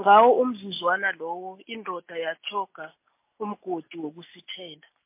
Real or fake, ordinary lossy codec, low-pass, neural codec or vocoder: real; MP3, 24 kbps; 3.6 kHz; none